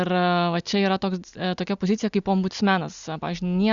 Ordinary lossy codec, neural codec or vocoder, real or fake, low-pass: Opus, 64 kbps; none; real; 7.2 kHz